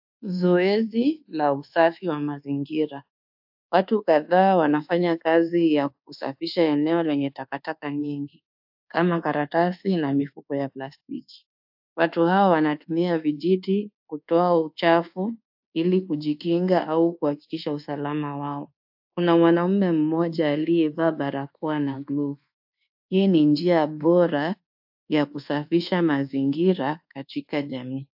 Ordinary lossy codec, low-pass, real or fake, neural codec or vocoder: AAC, 48 kbps; 5.4 kHz; fake; codec, 24 kHz, 1.2 kbps, DualCodec